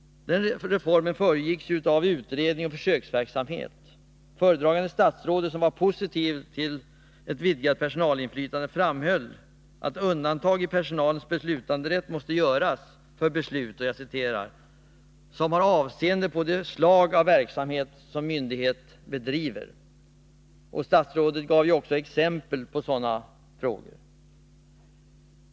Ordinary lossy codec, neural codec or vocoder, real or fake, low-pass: none; none; real; none